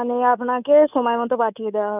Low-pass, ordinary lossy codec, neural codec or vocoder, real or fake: 3.6 kHz; none; codec, 24 kHz, 3.1 kbps, DualCodec; fake